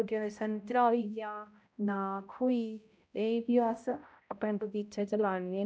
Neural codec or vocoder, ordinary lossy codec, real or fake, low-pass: codec, 16 kHz, 0.5 kbps, X-Codec, HuBERT features, trained on balanced general audio; none; fake; none